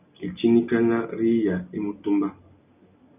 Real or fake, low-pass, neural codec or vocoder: real; 3.6 kHz; none